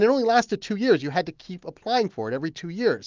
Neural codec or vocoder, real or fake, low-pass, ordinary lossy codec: none; real; 7.2 kHz; Opus, 32 kbps